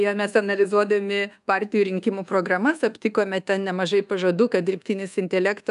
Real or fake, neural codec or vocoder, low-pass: fake; codec, 24 kHz, 1.2 kbps, DualCodec; 10.8 kHz